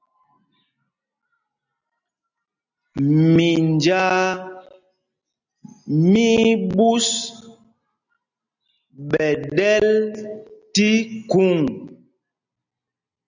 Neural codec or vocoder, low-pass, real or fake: none; 7.2 kHz; real